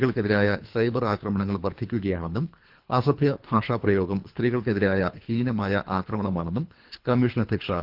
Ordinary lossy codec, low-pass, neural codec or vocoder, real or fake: Opus, 32 kbps; 5.4 kHz; codec, 24 kHz, 3 kbps, HILCodec; fake